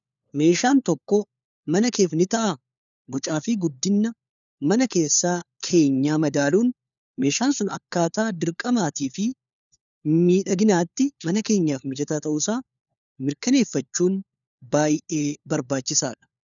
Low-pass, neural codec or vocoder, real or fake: 7.2 kHz; codec, 16 kHz, 4 kbps, FunCodec, trained on LibriTTS, 50 frames a second; fake